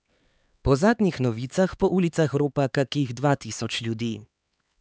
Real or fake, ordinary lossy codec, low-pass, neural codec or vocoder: fake; none; none; codec, 16 kHz, 4 kbps, X-Codec, HuBERT features, trained on LibriSpeech